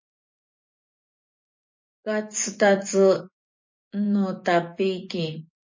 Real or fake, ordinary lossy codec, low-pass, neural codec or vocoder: fake; MP3, 32 kbps; 7.2 kHz; vocoder, 24 kHz, 100 mel bands, Vocos